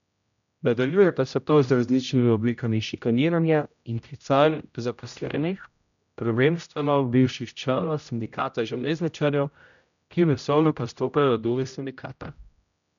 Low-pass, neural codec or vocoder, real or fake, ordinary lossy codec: 7.2 kHz; codec, 16 kHz, 0.5 kbps, X-Codec, HuBERT features, trained on general audio; fake; none